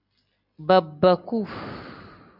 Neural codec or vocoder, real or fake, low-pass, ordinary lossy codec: none; real; 5.4 kHz; AAC, 48 kbps